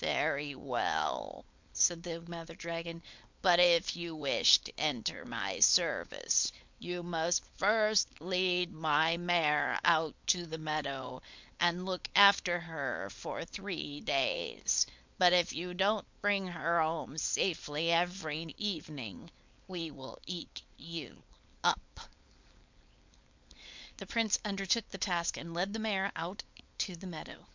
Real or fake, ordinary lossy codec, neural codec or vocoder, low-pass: fake; MP3, 64 kbps; codec, 16 kHz, 4.8 kbps, FACodec; 7.2 kHz